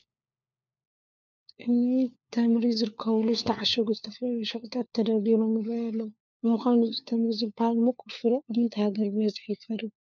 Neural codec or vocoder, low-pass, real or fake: codec, 16 kHz, 4 kbps, FunCodec, trained on LibriTTS, 50 frames a second; 7.2 kHz; fake